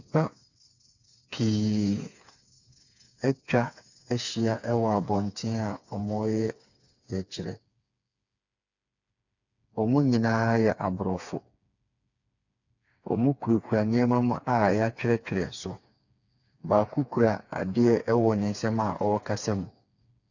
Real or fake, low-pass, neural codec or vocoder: fake; 7.2 kHz; codec, 16 kHz, 4 kbps, FreqCodec, smaller model